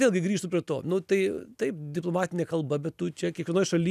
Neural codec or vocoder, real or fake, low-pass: none; real; 14.4 kHz